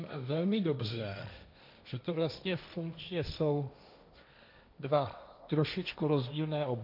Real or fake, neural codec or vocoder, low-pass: fake; codec, 16 kHz, 1.1 kbps, Voila-Tokenizer; 5.4 kHz